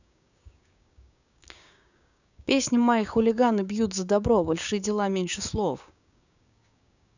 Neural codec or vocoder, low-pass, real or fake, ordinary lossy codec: codec, 16 kHz, 6 kbps, DAC; 7.2 kHz; fake; none